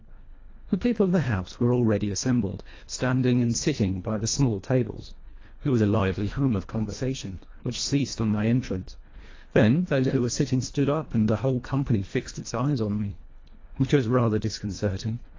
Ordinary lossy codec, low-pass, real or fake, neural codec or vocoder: AAC, 32 kbps; 7.2 kHz; fake; codec, 24 kHz, 1.5 kbps, HILCodec